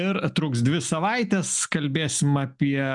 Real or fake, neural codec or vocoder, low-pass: real; none; 10.8 kHz